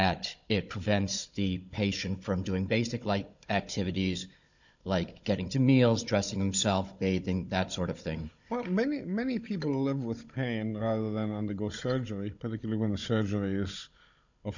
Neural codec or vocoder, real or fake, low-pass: codec, 16 kHz, 16 kbps, FunCodec, trained on Chinese and English, 50 frames a second; fake; 7.2 kHz